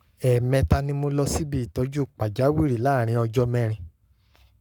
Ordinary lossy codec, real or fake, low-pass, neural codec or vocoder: none; fake; 19.8 kHz; codec, 44.1 kHz, 7.8 kbps, Pupu-Codec